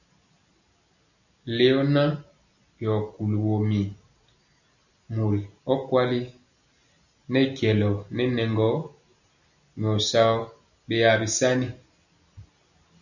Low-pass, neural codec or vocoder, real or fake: 7.2 kHz; none; real